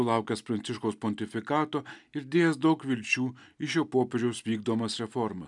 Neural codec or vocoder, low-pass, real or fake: none; 10.8 kHz; real